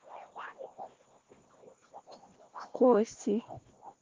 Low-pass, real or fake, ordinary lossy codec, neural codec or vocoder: 7.2 kHz; fake; Opus, 16 kbps; codec, 16 kHz, 1 kbps, FunCodec, trained on Chinese and English, 50 frames a second